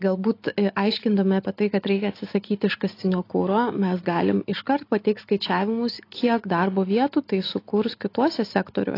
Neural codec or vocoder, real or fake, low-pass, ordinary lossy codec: none; real; 5.4 kHz; AAC, 32 kbps